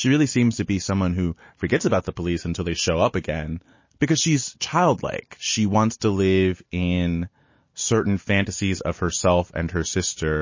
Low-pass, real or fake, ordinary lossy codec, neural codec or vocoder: 7.2 kHz; real; MP3, 32 kbps; none